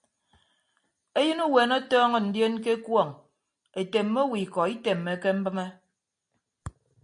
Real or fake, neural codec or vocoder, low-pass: real; none; 9.9 kHz